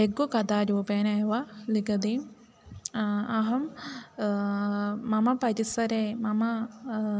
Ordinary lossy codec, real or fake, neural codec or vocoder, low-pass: none; real; none; none